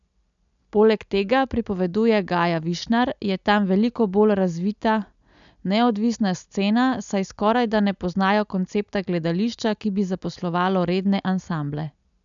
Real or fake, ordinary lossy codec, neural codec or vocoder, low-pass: real; none; none; 7.2 kHz